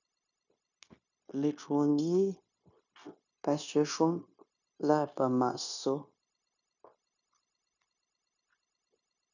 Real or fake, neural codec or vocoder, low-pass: fake; codec, 16 kHz, 0.9 kbps, LongCat-Audio-Codec; 7.2 kHz